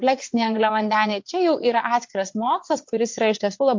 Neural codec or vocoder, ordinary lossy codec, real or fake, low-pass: vocoder, 22.05 kHz, 80 mel bands, WaveNeXt; MP3, 48 kbps; fake; 7.2 kHz